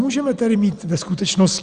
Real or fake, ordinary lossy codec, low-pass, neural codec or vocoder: real; Opus, 64 kbps; 9.9 kHz; none